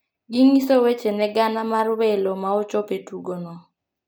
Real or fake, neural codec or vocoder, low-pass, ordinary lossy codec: real; none; none; none